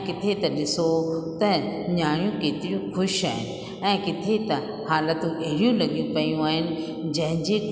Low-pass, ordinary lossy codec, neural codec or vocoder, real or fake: none; none; none; real